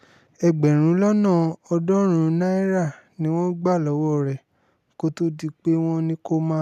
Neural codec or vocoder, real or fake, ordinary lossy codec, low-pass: none; real; none; 14.4 kHz